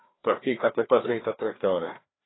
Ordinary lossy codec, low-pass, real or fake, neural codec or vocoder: AAC, 16 kbps; 7.2 kHz; fake; codec, 16 kHz, 2 kbps, FreqCodec, larger model